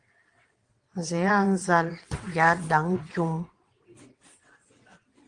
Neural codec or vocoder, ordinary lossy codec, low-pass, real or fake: vocoder, 22.05 kHz, 80 mel bands, WaveNeXt; Opus, 24 kbps; 9.9 kHz; fake